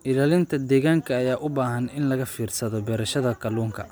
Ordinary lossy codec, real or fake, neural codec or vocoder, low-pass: none; real; none; none